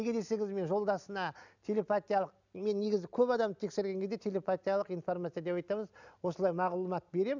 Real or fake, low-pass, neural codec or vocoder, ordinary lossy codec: real; 7.2 kHz; none; none